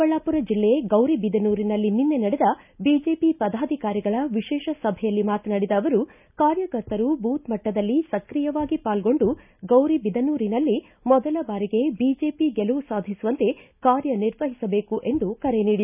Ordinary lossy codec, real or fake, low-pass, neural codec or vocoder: none; real; 3.6 kHz; none